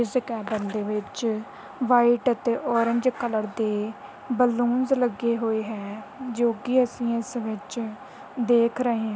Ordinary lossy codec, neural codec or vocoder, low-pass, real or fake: none; none; none; real